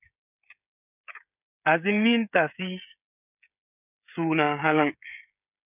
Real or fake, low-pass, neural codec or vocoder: fake; 3.6 kHz; codec, 16 kHz, 8 kbps, FreqCodec, smaller model